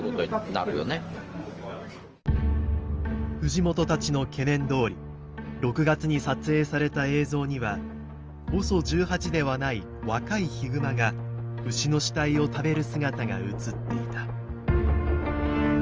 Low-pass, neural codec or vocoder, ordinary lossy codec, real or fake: 7.2 kHz; none; Opus, 24 kbps; real